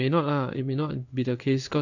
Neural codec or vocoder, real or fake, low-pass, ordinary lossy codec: codec, 16 kHz in and 24 kHz out, 1 kbps, XY-Tokenizer; fake; 7.2 kHz; none